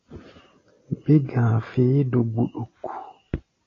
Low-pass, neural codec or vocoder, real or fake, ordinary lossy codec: 7.2 kHz; none; real; AAC, 32 kbps